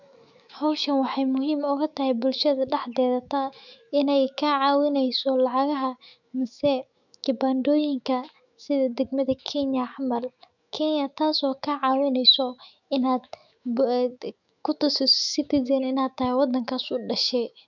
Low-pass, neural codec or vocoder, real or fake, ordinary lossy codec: 7.2 kHz; autoencoder, 48 kHz, 128 numbers a frame, DAC-VAE, trained on Japanese speech; fake; none